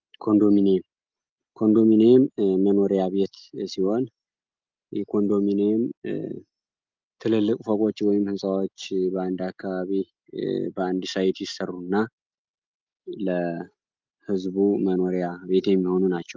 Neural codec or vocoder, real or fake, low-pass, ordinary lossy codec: none; real; 7.2 kHz; Opus, 32 kbps